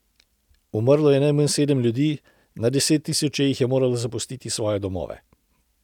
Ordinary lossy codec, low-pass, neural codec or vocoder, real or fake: none; 19.8 kHz; none; real